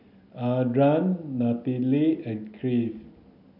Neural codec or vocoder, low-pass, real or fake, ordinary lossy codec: none; 5.4 kHz; real; none